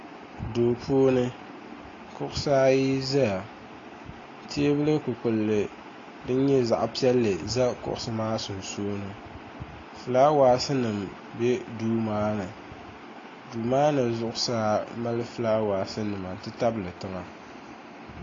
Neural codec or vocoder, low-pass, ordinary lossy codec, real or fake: none; 7.2 kHz; AAC, 32 kbps; real